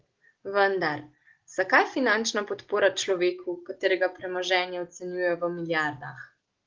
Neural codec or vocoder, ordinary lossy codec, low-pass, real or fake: none; Opus, 16 kbps; 7.2 kHz; real